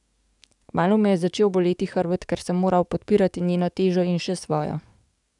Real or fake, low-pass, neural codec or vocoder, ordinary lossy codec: fake; 10.8 kHz; codec, 44.1 kHz, 7.8 kbps, DAC; none